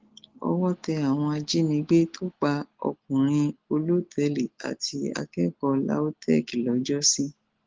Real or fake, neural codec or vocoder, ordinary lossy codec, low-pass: real; none; Opus, 16 kbps; 7.2 kHz